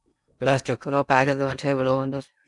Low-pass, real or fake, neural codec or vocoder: 10.8 kHz; fake; codec, 16 kHz in and 24 kHz out, 0.8 kbps, FocalCodec, streaming, 65536 codes